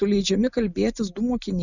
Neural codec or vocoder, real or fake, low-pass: none; real; 7.2 kHz